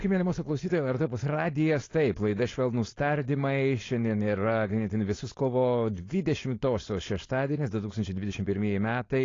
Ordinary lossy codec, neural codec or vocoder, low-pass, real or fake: AAC, 32 kbps; codec, 16 kHz, 4.8 kbps, FACodec; 7.2 kHz; fake